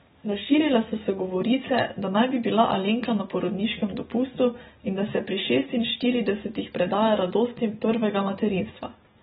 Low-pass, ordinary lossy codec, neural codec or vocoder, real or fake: 7.2 kHz; AAC, 16 kbps; none; real